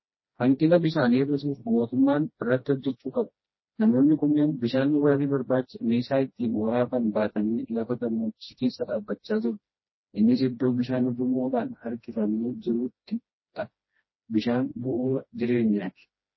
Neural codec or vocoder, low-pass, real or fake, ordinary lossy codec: codec, 16 kHz, 1 kbps, FreqCodec, smaller model; 7.2 kHz; fake; MP3, 24 kbps